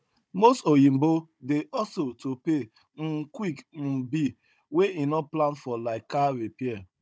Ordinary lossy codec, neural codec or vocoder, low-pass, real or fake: none; codec, 16 kHz, 16 kbps, FunCodec, trained on Chinese and English, 50 frames a second; none; fake